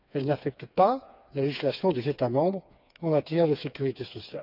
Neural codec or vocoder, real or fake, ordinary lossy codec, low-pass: codec, 16 kHz, 4 kbps, FreqCodec, smaller model; fake; none; 5.4 kHz